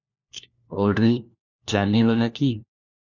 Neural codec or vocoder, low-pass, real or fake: codec, 16 kHz, 1 kbps, FunCodec, trained on LibriTTS, 50 frames a second; 7.2 kHz; fake